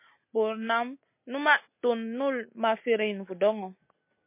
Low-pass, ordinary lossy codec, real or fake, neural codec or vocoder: 3.6 kHz; MP3, 24 kbps; real; none